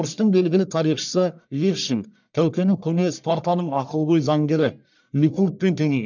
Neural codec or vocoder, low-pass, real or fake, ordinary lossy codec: codec, 44.1 kHz, 1.7 kbps, Pupu-Codec; 7.2 kHz; fake; none